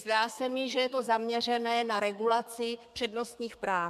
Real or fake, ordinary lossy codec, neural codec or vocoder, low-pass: fake; MP3, 96 kbps; codec, 32 kHz, 1.9 kbps, SNAC; 14.4 kHz